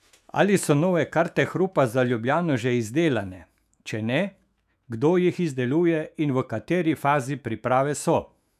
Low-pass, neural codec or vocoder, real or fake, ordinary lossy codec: 14.4 kHz; autoencoder, 48 kHz, 128 numbers a frame, DAC-VAE, trained on Japanese speech; fake; none